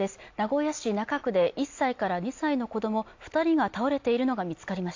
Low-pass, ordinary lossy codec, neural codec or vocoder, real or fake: 7.2 kHz; AAC, 48 kbps; none; real